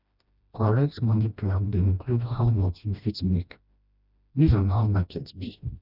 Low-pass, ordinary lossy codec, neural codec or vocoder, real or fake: 5.4 kHz; none; codec, 16 kHz, 1 kbps, FreqCodec, smaller model; fake